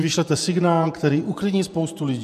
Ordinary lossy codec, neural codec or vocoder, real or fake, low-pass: MP3, 96 kbps; vocoder, 48 kHz, 128 mel bands, Vocos; fake; 14.4 kHz